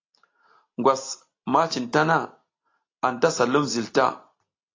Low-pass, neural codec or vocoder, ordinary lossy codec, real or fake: 7.2 kHz; none; AAC, 32 kbps; real